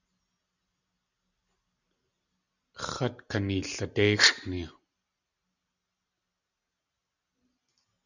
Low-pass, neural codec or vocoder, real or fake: 7.2 kHz; none; real